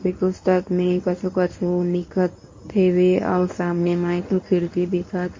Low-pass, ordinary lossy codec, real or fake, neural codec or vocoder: 7.2 kHz; MP3, 32 kbps; fake; codec, 24 kHz, 0.9 kbps, WavTokenizer, medium speech release version 1